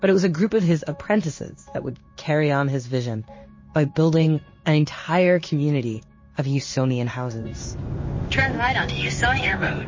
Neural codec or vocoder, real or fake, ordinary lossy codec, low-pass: codec, 16 kHz in and 24 kHz out, 1 kbps, XY-Tokenizer; fake; MP3, 32 kbps; 7.2 kHz